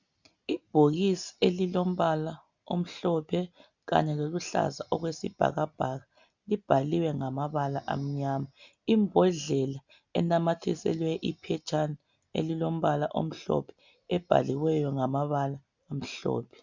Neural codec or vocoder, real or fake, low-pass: none; real; 7.2 kHz